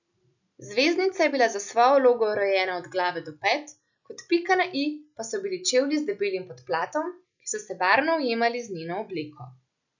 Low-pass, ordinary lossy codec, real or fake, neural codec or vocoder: 7.2 kHz; none; real; none